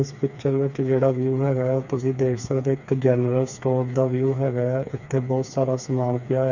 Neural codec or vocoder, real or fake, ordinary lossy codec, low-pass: codec, 16 kHz, 4 kbps, FreqCodec, smaller model; fake; none; 7.2 kHz